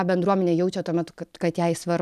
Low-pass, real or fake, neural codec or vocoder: 14.4 kHz; real; none